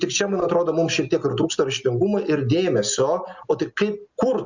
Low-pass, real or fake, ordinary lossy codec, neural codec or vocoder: 7.2 kHz; real; Opus, 64 kbps; none